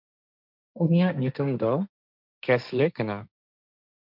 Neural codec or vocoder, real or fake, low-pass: codec, 16 kHz, 1.1 kbps, Voila-Tokenizer; fake; 5.4 kHz